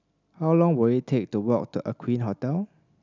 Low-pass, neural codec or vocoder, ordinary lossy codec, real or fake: 7.2 kHz; none; none; real